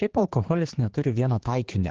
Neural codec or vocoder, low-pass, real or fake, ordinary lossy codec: codec, 16 kHz, 2 kbps, X-Codec, HuBERT features, trained on general audio; 7.2 kHz; fake; Opus, 16 kbps